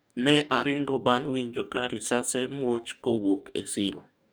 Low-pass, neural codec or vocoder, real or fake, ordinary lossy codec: none; codec, 44.1 kHz, 2.6 kbps, DAC; fake; none